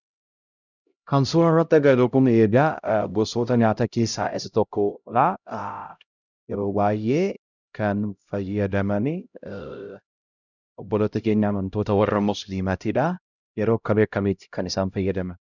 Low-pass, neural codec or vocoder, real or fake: 7.2 kHz; codec, 16 kHz, 0.5 kbps, X-Codec, HuBERT features, trained on LibriSpeech; fake